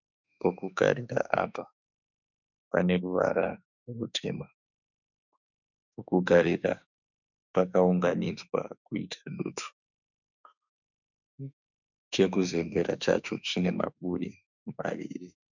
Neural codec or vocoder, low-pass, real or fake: autoencoder, 48 kHz, 32 numbers a frame, DAC-VAE, trained on Japanese speech; 7.2 kHz; fake